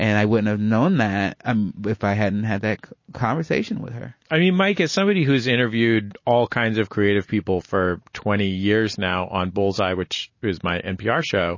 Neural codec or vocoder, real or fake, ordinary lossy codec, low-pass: none; real; MP3, 32 kbps; 7.2 kHz